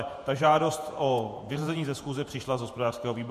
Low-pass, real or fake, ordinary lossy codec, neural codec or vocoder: 14.4 kHz; fake; MP3, 64 kbps; vocoder, 48 kHz, 128 mel bands, Vocos